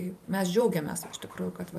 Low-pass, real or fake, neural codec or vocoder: 14.4 kHz; fake; vocoder, 44.1 kHz, 128 mel bands every 512 samples, BigVGAN v2